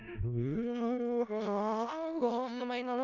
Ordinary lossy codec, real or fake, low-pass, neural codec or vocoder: none; fake; 7.2 kHz; codec, 16 kHz in and 24 kHz out, 0.4 kbps, LongCat-Audio-Codec, four codebook decoder